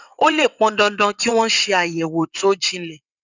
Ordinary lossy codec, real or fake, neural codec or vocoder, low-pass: none; fake; vocoder, 22.05 kHz, 80 mel bands, WaveNeXt; 7.2 kHz